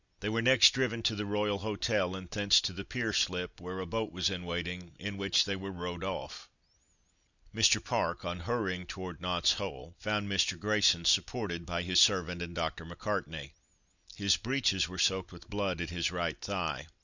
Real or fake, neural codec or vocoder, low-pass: real; none; 7.2 kHz